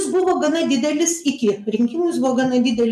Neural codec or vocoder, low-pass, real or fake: none; 14.4 kHz; real